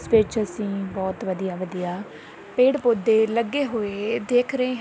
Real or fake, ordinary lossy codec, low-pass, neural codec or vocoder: real; none; none; none